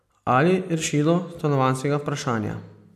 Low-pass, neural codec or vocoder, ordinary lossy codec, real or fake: 14.4 kHz; none; MP3, 96 kbps; real